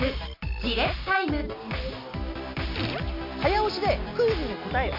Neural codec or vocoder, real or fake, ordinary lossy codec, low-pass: none; real; MP3, 32 kbps; 5.4 kHz